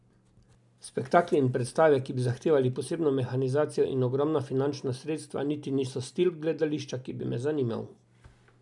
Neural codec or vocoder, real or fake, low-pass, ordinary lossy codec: none; real; 10.8 kHz; none